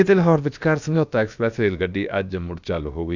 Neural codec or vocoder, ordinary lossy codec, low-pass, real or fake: codec, 16 kHz, about 1 kbps, DyCAST, with the encoder's durations; none; 7.2 kHz; fake